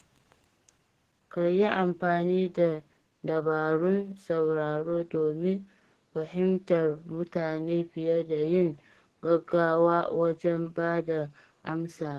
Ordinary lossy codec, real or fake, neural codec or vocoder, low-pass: Opus, 16 kbps; fake; codec, 44.1 kHz, 2.6 kbps, SNAC; 14.4 kHz